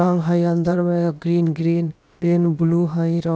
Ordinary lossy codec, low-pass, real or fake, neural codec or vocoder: none; none; fake; codec, 16 kHz, about 1 kbps, DyCAST, with the encoder's durations